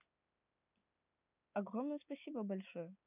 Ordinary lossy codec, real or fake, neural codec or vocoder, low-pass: none; real; none; 3.6 kHz